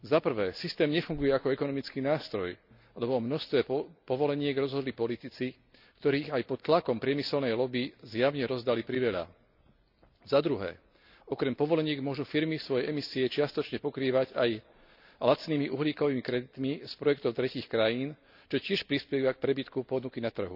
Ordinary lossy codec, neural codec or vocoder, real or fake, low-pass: none; none; real; 5.4 kHz